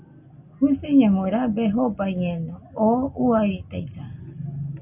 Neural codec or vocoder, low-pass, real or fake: none; 3.6 kHz; real